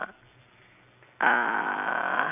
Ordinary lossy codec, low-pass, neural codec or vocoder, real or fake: none; 3.6 kHz; none; real